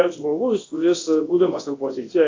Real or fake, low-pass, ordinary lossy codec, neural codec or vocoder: fake; 7.2 kHz; AAC, 32 kbps; codec, 24 kHz, 0.9 kbps, WavTokenizer, large speech release